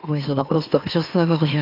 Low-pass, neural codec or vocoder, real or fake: 5.4 kHz; autoencoder, 44.1 kHz, a latent of 192 numbers a frame, MeloTTS; fake